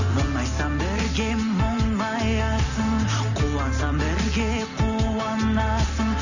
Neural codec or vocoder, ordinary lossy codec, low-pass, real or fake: none; none; 7.2 kHz; real